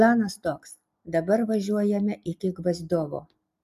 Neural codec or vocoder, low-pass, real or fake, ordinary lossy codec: none; 14.4 kHz; real; MP3, 96 kbps